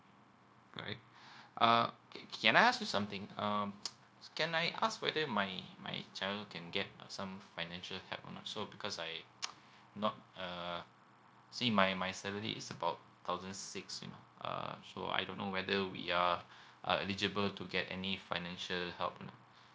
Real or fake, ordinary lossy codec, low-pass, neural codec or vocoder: fake; none; none; codec, 16 kHz, 0.9 kbps, LongCat-Audio-Codec